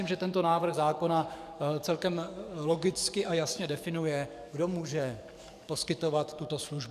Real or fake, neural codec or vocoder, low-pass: fake; codec, 44.1 kHz, 7.8 kbps, DAC; 14.4 kHz